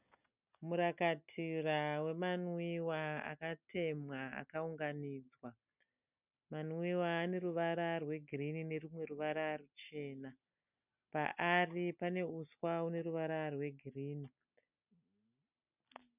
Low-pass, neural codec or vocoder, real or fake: 3.6 kHz; none; real